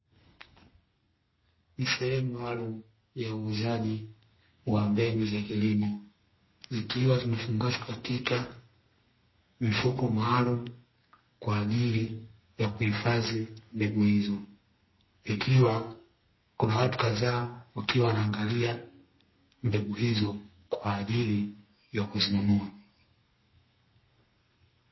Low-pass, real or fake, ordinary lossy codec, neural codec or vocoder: 7.2 kHz; fake; MP3, 24 kbps; codec, 32 kHz, 1.9 kbps, SNAC